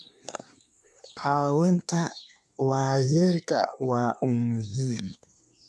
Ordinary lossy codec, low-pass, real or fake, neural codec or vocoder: none; none; fake; codec, 24 kHz, 1 kbps, SNAC